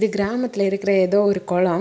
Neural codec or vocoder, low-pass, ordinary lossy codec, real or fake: none; none; none; real